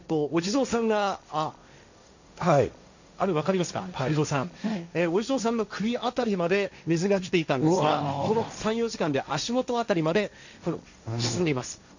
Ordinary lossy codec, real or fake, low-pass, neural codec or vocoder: none; fake; 7.2 kHz; codec, 16 kHz, 1.1 kbps, Voila-Tokenizer